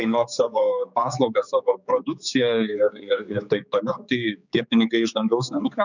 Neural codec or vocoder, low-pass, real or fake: codec, 16 kHz, 4 kbps, X-Codec, HuBERT features, trained on general audio; 7.2 kHz; fake